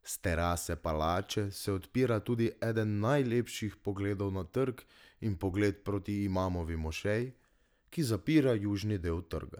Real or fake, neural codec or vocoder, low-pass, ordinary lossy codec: real; none; none; none